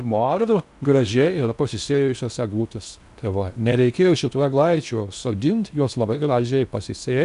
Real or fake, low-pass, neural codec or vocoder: fake; 10.8 kHz; codec, 16 kHz in and 24 kHz out, 0.6 kbps, FocalCodec, streaming, 4096 codes